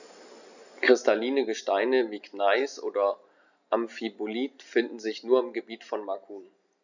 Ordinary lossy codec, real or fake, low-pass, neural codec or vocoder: none; real; 7.2 kHz; none